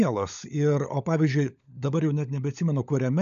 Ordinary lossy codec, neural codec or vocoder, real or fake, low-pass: AAC, 96 kbps; codec, 16 kHz, 16 kbps, FunCodec, trained on LibriTTS, 50 frames a second; fake; 7.2 kHz